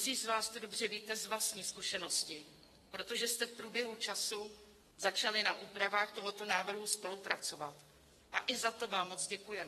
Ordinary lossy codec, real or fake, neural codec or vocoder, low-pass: AAC, 32 kbps; fake; codec, 32 kHz, 1.9 kbps, SNAC; 14.4 kHz